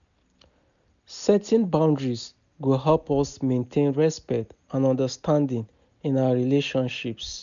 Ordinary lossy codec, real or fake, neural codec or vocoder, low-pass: none; real; none; 7.2 kHz